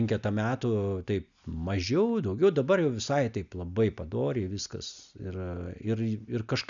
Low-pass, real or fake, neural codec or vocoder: 7.2 kHz; real; none